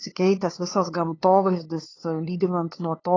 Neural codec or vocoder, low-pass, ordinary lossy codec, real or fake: codec, 16 kHz, 2 kbps, FunCodec, trained on LibriTTS, 25 frames a second; 7.2 kHz; AAC, 32 kbps; fake